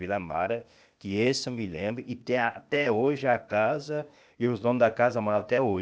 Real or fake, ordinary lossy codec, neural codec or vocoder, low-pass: fake; none; codec, 16 kHz, 0.8 kbps, ZipCodec; none